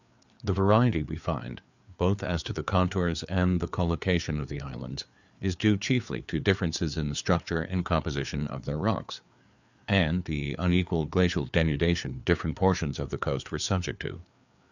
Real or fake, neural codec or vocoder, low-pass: fake; codec, 16 kHz, 4 kbps, FreqCodec, larger model; 7.2 kHz